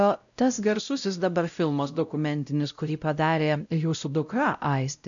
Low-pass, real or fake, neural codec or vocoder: 7.2 kHz; fake; codec, 16 kHz, 0.5 kbps, X-Codec, WavLM features, trained on Multilingual LibriSpeech